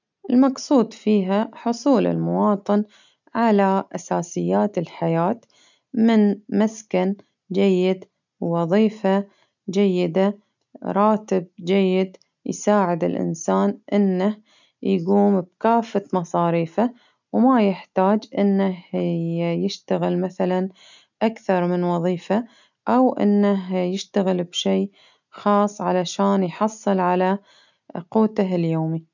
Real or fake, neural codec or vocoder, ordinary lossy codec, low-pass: real; none; none; 7.2 kHz